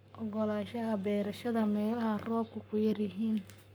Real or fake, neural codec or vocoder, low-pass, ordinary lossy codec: fake; codec, 44.1 kHz, 7.8 kbps, Pupu-Codec; none; none